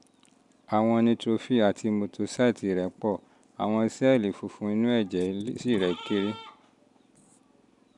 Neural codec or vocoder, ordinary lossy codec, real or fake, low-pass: none; none; real; 10.8 kHz